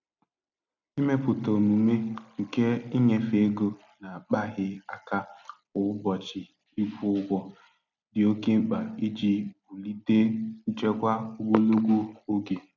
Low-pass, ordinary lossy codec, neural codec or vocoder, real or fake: 7.2 kHz; none; none; real